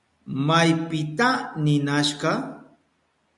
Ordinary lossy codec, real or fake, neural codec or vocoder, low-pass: MP3, 64 kbps; real; none; 10.8 kHz